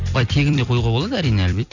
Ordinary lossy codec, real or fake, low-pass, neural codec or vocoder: none; fake; 7.2 kHz; vocoder, 44.1 kHz, 128 mel bands every 256 samples, BigVGAN v2